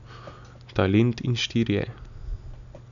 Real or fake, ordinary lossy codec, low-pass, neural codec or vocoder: real; none; 7.2 kHz; none